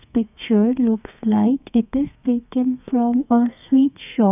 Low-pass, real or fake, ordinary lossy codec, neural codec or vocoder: 3.6 kHz; fake; none; codec, 32 kHz, 1.9 kbps, SNAC